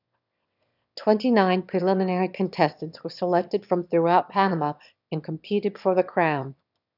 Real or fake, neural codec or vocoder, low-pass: fake; autoencoder, 22.05 kHz, a latent of 192 numbers a frame, VITS, trained on one speaker; 5.4 kHz